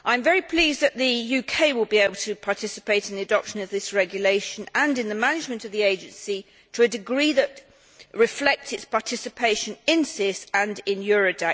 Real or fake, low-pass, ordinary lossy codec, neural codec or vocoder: real; none; none; none